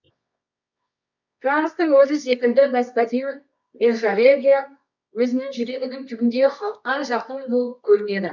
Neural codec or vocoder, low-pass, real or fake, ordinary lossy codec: codec, 24 kHz, 0.9 kbps, WavTokenizer, medium music audio release; 7.2 kHz; fake; none